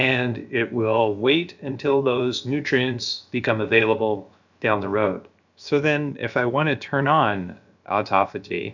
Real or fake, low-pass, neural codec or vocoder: fake; 7.2 kHz; codec, 16 kHz, about 1 kbps, DyCAST, with the encoder's durations